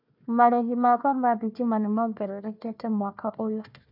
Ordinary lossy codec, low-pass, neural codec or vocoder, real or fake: none; 5.4 kHz; codec, 16 kHz, 1 kbps, FunCodec, trained on Chinese and English, 50 frames a second; fake